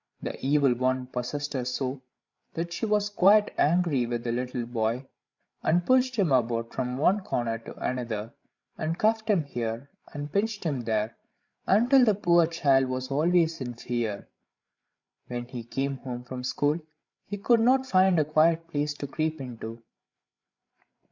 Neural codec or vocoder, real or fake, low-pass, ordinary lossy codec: codec, 16 kHz, 16 kbps, FreqCodec, larger model; fake; 7.2 kHz; MP3, 64 kbps